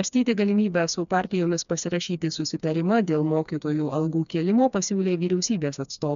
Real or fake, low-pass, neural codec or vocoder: fake; 7.2 kHz; codec, 16 kHz, 2 kbps, FreqCodec, smaller model